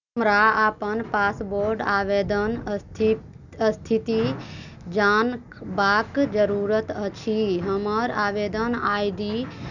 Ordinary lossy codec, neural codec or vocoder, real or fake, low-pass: none; none; real; 7.2 kHz